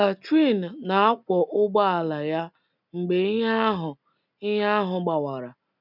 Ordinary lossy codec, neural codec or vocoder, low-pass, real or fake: none; none; 5.4 kHz; real